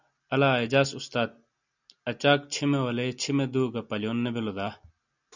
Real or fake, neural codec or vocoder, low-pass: real; none; 7.2 kHz